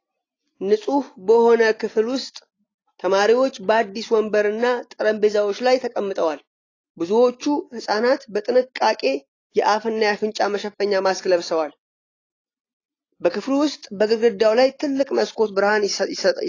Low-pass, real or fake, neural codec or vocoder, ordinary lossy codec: 7.2 kHz; real; none; AAC, 32 kbps